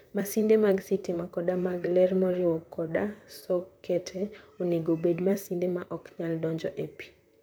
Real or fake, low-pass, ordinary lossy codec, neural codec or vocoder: fake; none; none; vocoder, 44.1 kHz, 128 mel bands, Pupu-Vocoder